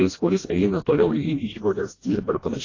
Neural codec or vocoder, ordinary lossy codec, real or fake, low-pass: codec, 16 kHz, 1 kbps, FreqCodec, smaller model; AAC, 32 kbps; fake; 7.2 kHz